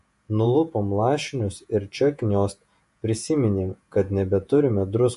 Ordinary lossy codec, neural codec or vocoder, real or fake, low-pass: MP3, 48 kbps; vocoder, 48 kHz, 128 mel bands, Vocos; fake; 14.4 kHz